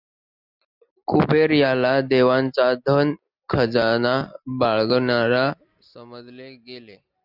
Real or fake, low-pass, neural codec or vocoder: real; 5.4 kHz; none